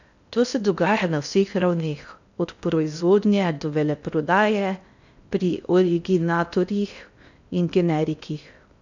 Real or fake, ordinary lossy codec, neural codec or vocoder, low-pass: fake; none; codec, 16 kHz in and 24 kHz out, 0.6 kbps, FocalCodec, streaming, 2048 codes; 7.2 kHz